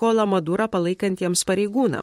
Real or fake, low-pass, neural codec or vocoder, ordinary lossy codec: fake; 19.8 kHz; vocoder, 44.1 kHz, 128 mel bands, Pupu-Vocoder; MP3, 64 kbps